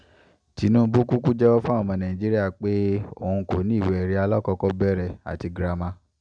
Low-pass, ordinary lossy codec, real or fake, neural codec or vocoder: 9.9 kHz; none; real; none